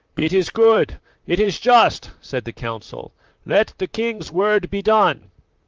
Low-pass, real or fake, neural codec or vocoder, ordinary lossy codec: 7.2 kHz; fake; vocoder, 44.1 kHz, 128 mel bands, Pupu-Vocoder; Opus, 24 kbps